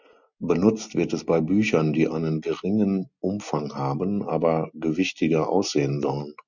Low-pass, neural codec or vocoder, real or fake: 7.2 kHz; none; real